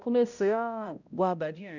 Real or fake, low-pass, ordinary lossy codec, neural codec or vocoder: fake; 7.2 kHz; none; codec, 16 kHz, 0.5 kbps, X-Codec, HuBERT features, trained on balanced general audio